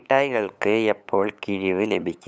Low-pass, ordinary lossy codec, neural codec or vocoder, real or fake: none; none; codec, 16 kHz, 8 kbps, FunCodec, trained on LibriTTS, 25 frames a second; fake